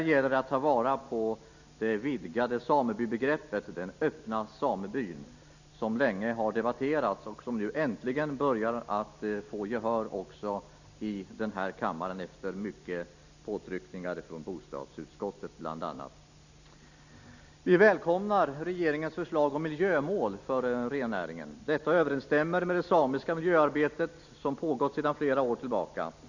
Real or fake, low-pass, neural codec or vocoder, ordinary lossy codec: real; 7.2 kHz; none; none